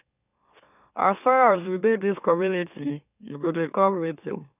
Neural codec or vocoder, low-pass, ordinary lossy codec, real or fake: autoencoder, 44.1 kHz, a latent of 192 numbers a frame, MeloTTS; 3.6 kHz; none; fake